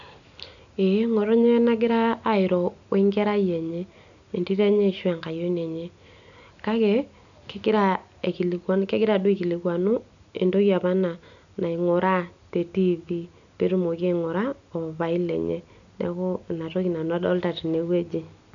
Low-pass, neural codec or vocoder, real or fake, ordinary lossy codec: 7.2 kHz; none; real; none